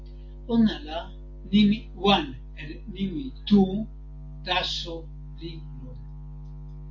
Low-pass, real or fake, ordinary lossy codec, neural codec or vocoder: 7.2 kHz; real; AAC, 48 kbps; none